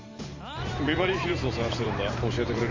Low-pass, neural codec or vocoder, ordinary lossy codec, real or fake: 7.2 kHz; vocoder, 44.1 kHz, 128 mel bands every 512 samples, BigVGAN v2; MP3, 64 kbps; fake